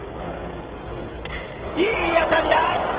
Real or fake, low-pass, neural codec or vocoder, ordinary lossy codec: fake; 3.6 kHz; codec, 16 kHz, 16 kbps, FreqCodec, smaller model; Opus, 16 kbps